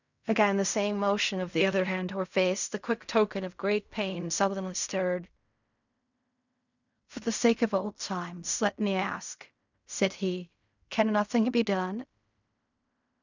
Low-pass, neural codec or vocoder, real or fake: 7.2 kHz; codec, 16 kHz in and 24 kHz out, 0.4 kbps, LongCat-Audio-Codec, fine tuned four codebook decoder; fake